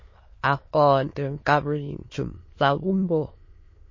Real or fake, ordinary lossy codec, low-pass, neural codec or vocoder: fake; MP3, 32 kbps; 7.2 kHz; autoencoder, 22.05 kHz, a latent of 192 numbers a frame, VITS, trained on many speakers